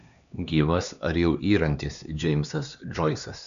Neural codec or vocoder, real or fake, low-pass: codec, 16 kHz, 2 kbps, X-Codec, HuBERT features, trained on LibriSpeech; fake; 7.2 kHz